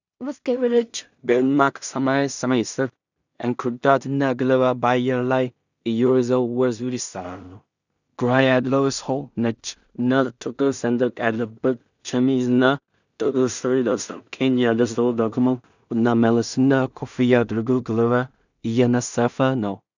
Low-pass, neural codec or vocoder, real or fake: 7.2 kHz; codec, 16 kHz in and 24 kHz out, 0.4 kbps, LongCat-Audio-Codec, two codebook decoder; fake